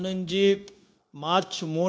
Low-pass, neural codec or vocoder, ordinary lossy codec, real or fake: none; codec, 16 kHz, 0.9 kbps, LongCat-Audio-Codec; none; fake